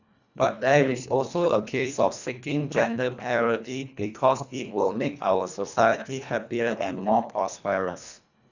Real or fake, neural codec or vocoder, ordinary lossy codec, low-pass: fake; codec, 24 kHz, 1.5 kbps, HILCodec; none; 7.2 kHz